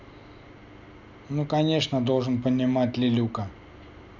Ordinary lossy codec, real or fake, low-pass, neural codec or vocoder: none; real; 7.2 kHz; none